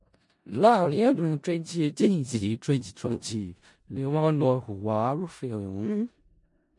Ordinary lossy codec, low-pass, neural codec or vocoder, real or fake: MP3, 48 kbps; 10.8 kHz; codec, 16 kHz in and 24 kHz out, 0.4 kbps, LongCat-Audio-Codec, four codebook decoder; fake